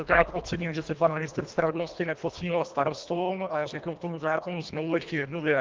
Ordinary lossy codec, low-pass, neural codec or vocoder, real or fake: Opus, 32 kbps; 7.2 kHz; codec, 24 kHz, 1.5 kbps, HILCodec; fake